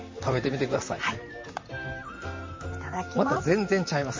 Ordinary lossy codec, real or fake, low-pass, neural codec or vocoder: MP3, 32 kbps; fake; 7.2 kHz; vocoder, 44.1 kHz, 128 mel bands, Pupu-Vocoder